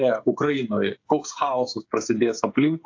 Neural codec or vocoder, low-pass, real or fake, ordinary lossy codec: codec, 16 kHz, 8 kbps, FreqCodec, smaller model; 7.2 kHz; fake; AAC, 48 kbps